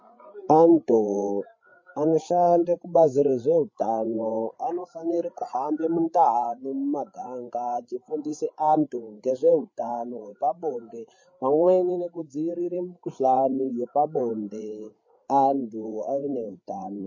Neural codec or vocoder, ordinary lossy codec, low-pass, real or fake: codec, 16 kHz, 8 kbps, FreqCodec, larger model; MP3, 32 kbps; 7.2 kHz; fake